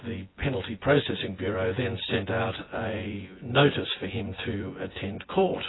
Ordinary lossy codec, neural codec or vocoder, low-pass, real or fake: AAC, 16 kbps; vocoder, 24 kHz, 100 mel bands, Vocos; 7.2 kHz; fake